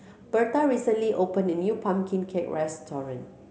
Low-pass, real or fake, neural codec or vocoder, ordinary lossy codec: none; real; none; none